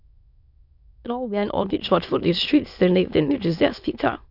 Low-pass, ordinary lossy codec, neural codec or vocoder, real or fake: 5.4 kHz; none; autoencoder, 22.05 kHz, a latent of 192 numbers a frame, VITS, trained on many speakers; fake